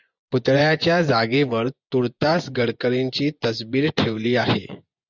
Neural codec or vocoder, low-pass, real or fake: vocoder, 24 kHz, 100 mel bands, Vocos; 7.2 kHz; fake